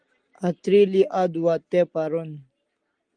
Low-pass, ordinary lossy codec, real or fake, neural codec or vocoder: 9.9 kHz; Opus, 24 kbps; real; none